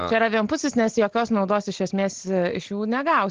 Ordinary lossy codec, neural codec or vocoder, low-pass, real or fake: Opus, 16 kbps; none; 7.2 kHz; real